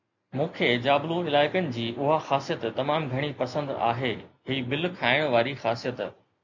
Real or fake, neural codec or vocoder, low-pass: real; none; 7.2 kHz